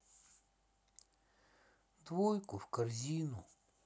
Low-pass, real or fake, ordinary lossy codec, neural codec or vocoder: none; real; none; none